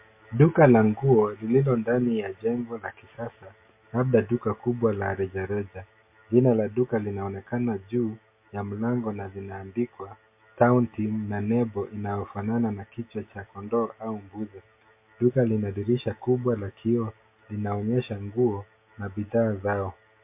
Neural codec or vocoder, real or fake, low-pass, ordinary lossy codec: none; real; 3.6 kHz; MP3, 24 kbps